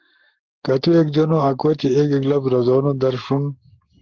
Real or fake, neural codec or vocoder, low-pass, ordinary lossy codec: fake; codec, 44.1 kHz, 7.8 kbps, Pupu-Codec; 7.2 kHz; Opus, 16 kbps